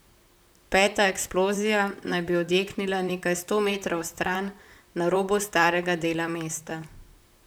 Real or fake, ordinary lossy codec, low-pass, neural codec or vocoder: fake; none; none; vocoder, 44.1 kHz, 128 mel bands, Pupu-Vocoder